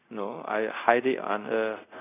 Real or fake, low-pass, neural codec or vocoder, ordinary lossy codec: fake; 3.6 kHz; codec, 24 kHz, 0.5 kbps, DualCodec; none